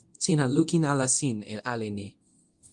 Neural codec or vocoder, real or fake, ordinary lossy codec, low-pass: codec, 24 kHz, 0.9 kbps, DualCodec; fake; Opus, 24 kbps; 10.8 kHz